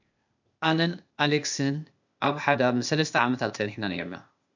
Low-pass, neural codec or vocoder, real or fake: 7.2 kHz; codec, 16 kHz, 0.8 kbps, ZipCodec; fake